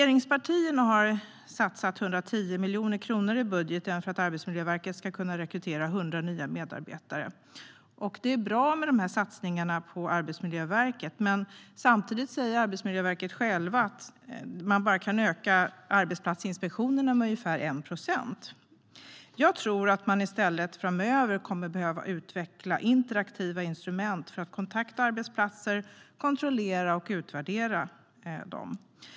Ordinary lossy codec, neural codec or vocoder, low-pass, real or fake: none; none; none; real